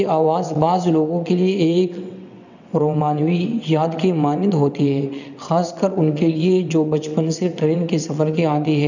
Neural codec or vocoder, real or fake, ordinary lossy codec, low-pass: vocoder, 22.05 kHz, 80 mel bands, WaveNeXt; fake; none; 7.2 kHz